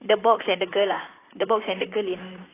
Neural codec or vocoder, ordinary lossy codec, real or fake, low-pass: vocoder, 44.1 kHz, 128 mel bands, Pupu-Vocoder; AAC, 16 kbps; fake; 3.6 kHz